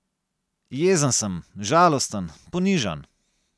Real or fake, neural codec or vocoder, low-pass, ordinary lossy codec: real; none; none; none